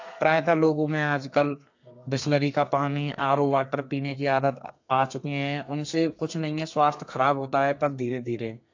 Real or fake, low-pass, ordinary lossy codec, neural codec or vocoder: fake; 7.2 kHz; AAC, 48 kbps; codec, 32 kHz, 1.9 kbps, SNAC